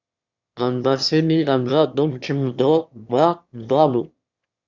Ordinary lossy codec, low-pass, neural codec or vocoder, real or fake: Opus, 64 kbps; 7.2 kHz; autoencoder, 22.05 kHz, a latent of 192 numbers a frame, VITS, trained on one speaker; fake